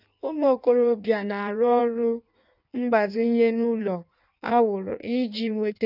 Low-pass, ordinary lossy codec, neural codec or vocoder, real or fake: 5.4 kHz; none; codec, 16 kHz in and 24 kHz out, 1.1 kbps, FireRedTTS-2 codec; fake